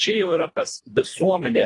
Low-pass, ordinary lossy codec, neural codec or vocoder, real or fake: 10.8 kHz; AAC, 32 kbps; codec, 24 kHz, 1.5 kbps, HILCodec; fake